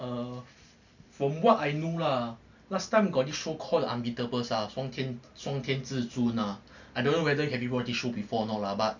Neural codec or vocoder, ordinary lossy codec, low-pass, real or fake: none; none; 7.2 kHz; real